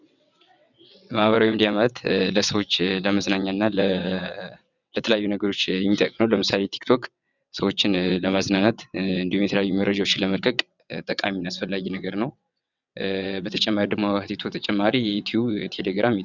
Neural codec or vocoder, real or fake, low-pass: vocoder, 22.05 kHz, 80 mel bands, WaveNeXt; fake; 7.2 kHz